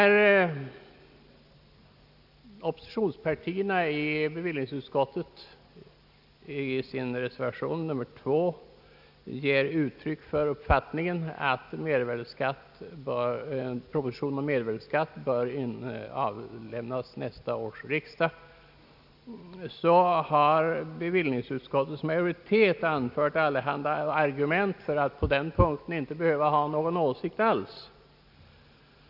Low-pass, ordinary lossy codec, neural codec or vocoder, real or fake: 5.4 kHz; none; none; real